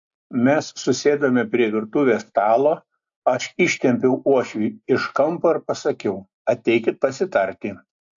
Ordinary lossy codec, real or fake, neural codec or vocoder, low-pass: AAC, 64 kbps; real; none; 7.2 kHz